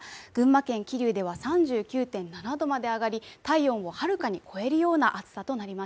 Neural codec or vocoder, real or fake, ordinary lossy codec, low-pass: none; real; none; none